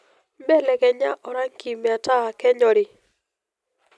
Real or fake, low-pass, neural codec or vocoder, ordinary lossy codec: real; none; none; none